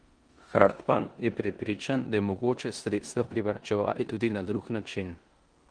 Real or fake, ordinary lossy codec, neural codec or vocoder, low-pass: fake; Opus, 24 kbps; codec, 16 kHz in and 24 kHz out, 0.9 kbps, LongCat-Audio-Codec, four codebook decoder; 9.9 kHz